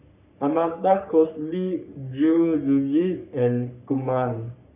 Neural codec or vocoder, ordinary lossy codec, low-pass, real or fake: codec, 44.1 kHz, 3.4 kbps, Pupu-Codec; none; 3.6 kHz; fake